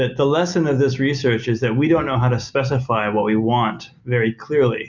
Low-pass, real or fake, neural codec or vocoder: 7.2 kHz; real; none